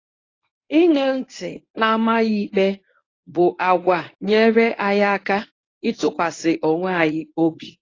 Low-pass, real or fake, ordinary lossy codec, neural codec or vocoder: 7.2 kHz; fake; AAC, 32 kbps; codec, 24 kHz, 0.9 kbps, WavTokenizer, medium speech release version 1